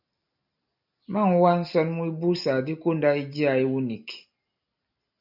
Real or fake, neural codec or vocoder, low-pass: real; none; 5.4 kHz